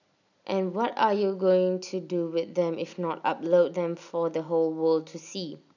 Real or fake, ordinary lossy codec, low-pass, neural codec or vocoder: real; none; 7.2 kHz; none